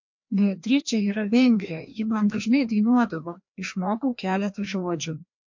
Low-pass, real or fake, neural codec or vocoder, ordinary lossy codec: 7.2 kHz; fake; codec, 16 kHz, 1 kbps, FreqCodec, larger model; MP3, 48 kbps